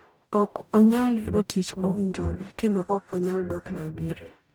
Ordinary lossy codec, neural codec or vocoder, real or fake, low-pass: none; codec, 44.1 kHz, 0.9 kbps, DAC; fake; none